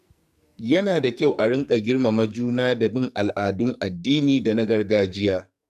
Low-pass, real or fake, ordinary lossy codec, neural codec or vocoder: 14.4 kHz; fake; none; codec, 44.1 kHz, 2.6 kbps, SNAC